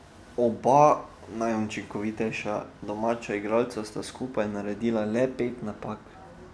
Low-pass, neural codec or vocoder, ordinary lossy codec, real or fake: none; none; none; real